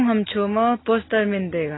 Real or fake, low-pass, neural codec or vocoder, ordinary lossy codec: real; 7.2 kHz; none; AAC, 16 kbps